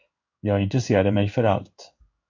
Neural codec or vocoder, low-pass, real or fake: codec, 16 kHz in and 24 kHz out, 1 kbps, XY-Tokenizer; 7.2 kHz; fake